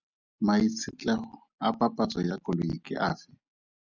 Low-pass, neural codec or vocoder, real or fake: 7.2 kHz; none; real